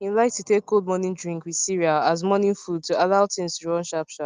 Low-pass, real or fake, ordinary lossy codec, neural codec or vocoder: 7.2 kHz; real; Opus, 24 kbps; none